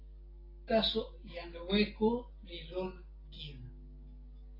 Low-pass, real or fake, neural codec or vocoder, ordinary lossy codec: 5.4 kHz; real; none; AAC, 24 kbps